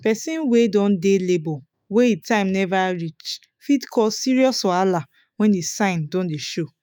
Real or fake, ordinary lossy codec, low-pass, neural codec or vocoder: fake; none; none; autoencoder, 48 kHz, 128 numbers a frame, DAC-VAE, trained on Japanese speech